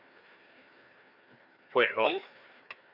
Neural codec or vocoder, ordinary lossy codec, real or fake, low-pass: codec, 16 kHz, 2 kbps, FreqCodec, larger model; AAC, 48 kbps; fake; 5.4 kHz